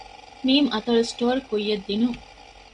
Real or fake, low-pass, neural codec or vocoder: real; 10.8 kHz; none